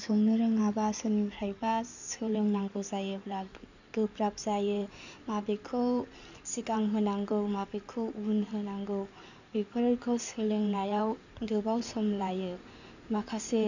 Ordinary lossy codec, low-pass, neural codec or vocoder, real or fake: none; 7.2 kHz; codec, 16 kHz in and 24 kHz out, 2.2 kbps, FireRedTTS-2 codec; fake